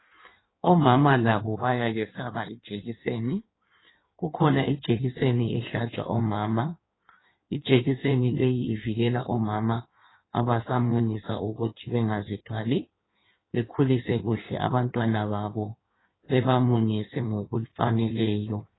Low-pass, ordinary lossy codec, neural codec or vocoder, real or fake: 7.2 kHz; AAC, 16 kbps; codec, 16 kHz in and 24 kHz out, 1.1 kbps, FireRedTTS-2 codec; fake